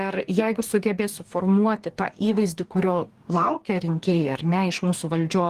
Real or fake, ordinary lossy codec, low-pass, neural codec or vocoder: fake; Opus, 24 kbps; 14.4 kHz; codec, 44.1 kHz, 2.6 kbps, DAC